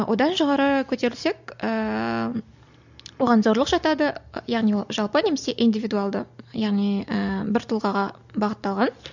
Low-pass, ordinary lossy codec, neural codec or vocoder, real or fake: 7.2 kHz; none; none; real